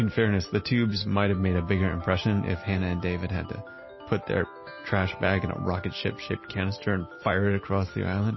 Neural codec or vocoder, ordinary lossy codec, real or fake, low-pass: none; MP3, 24 kbps; real; 7.2 kHz